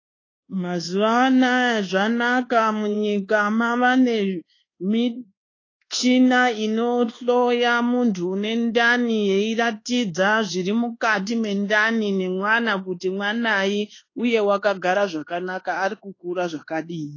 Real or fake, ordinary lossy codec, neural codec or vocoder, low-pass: fake; AAC, 32 kbps; codec, 24 kHz, 1.2 kbps, DualCodec; 7.2 kHz